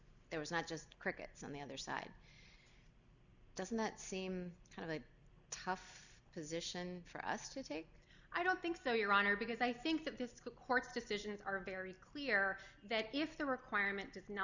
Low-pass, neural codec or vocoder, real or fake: 7.2 kHz; none; real